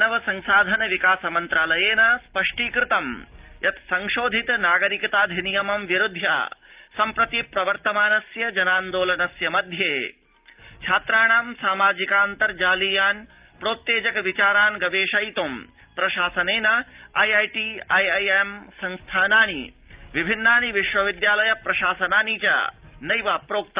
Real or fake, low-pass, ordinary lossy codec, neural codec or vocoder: real; 3.6 kHz; Opus, 32 kbps; none